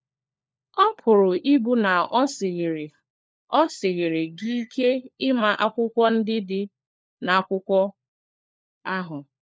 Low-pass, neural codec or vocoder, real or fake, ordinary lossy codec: none; codec, 16 kHz, 4 kbps, FunCodec, trained on LibriTTS, 50 frames a second; fake; none